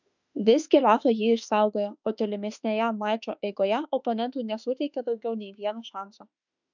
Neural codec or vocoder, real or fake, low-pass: autoencoder, 48 kHz, 32 numbers a frame, DAC-VAE, trained on Japanese speech; fake; 7.2 kHz